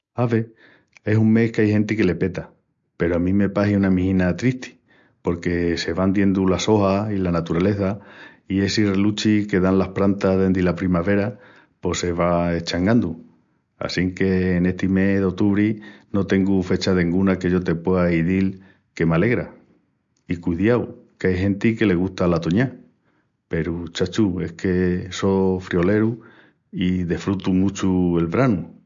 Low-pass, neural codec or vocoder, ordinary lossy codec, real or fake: 7.2 kHz; none; MP3, 48 kbps; real